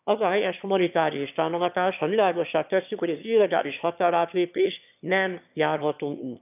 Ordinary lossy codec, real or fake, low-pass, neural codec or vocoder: none; fake; 3.6 kHz; autoencoder, 22.05 kHz, a latent of 192 numbers a frame, VITS, trained on one speaker